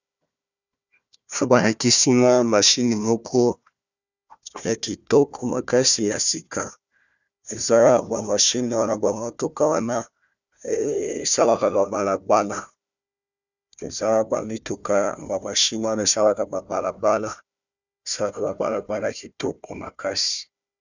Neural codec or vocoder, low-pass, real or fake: codec, 16 kHz, 1 kbps, FunCodec, trained on Chinese and English, 50 frames a second; 7.2 kHz; fake